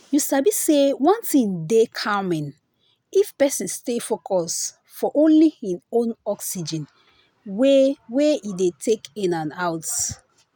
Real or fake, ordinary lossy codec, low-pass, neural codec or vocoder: real; none; none; none